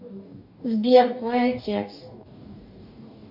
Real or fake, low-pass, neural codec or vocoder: fake; 5.4 kHz; codec, 44.1 kHz, 2.6 kbps, DAC